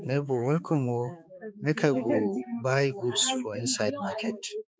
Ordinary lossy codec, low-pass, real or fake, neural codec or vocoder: none; none; fake; codec, 16 kHz, 4 kbps, X-Codec, HuBERT features, trained on balanced general audio